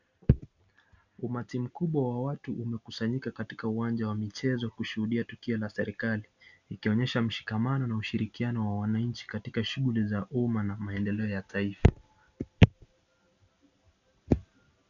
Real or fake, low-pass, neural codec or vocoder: real; 7.2 kHz; none